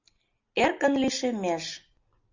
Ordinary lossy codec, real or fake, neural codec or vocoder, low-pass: MP3, 64 kbps; real; none; 7.2 kHz